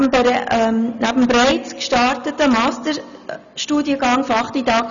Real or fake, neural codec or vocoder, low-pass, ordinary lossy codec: real; none; 7.2 kHz; none